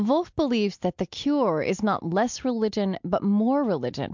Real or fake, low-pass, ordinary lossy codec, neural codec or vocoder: real; 7.2 kHz; MP3, 64 kbps; none